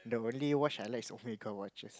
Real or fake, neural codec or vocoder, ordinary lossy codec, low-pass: real; none; none; none